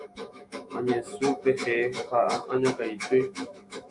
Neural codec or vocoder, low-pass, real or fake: autoencoder, 48 kHz, 128 numbers a frame, DAC-VAE, trained on Japanese speech; 10.8 kHz; fake